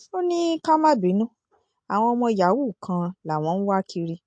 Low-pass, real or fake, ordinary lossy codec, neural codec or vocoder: 9.9 kHz; real; MP3, 48 kbps; none